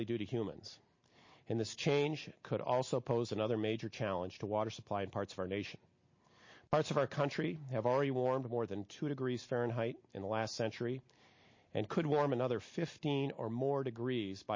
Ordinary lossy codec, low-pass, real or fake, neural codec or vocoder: MP3, 32 kbps; 7.2 kHz; real; none